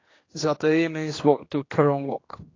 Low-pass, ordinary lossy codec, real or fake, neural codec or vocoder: 7.2 kHz; AAC, 32 kbps; fake; codec, 16 kHz, 2 kbps, X-Codec, HuBERT features, trained on general audio